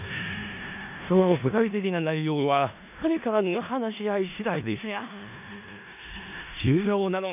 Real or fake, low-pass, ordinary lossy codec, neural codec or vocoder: fake; 3.6 kHz; none; codec, 16 kHz in and 24 kHz out, 0.4 kbps, LongCat-Audio-Codec, four codebook decoder